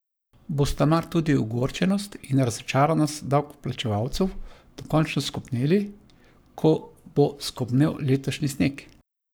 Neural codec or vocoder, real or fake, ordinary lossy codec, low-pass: codec, 44.1 kHz, 7.8 kbps, Pupu-Codec; fake; none; none